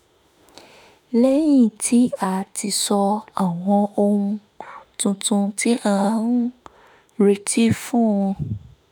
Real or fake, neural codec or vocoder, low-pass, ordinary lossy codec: fake; autoencoder, 48 kHz, 32 numbers a frame, DAC-VAE, trained on Japanese speech; none; none